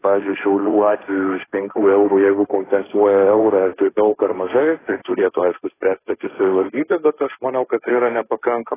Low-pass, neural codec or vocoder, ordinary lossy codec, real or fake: 3.6 kHz; codec, 16 kHz, 1.1 kbps, Voila-Tokenizer; AAC, 16 kbps; fake